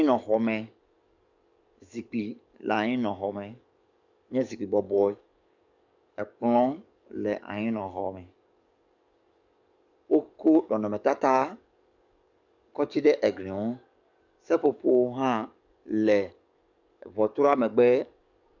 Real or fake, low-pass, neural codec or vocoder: fake; 7.2 kHz; codec, 44.1 kHz, 7.8 kbps, DAC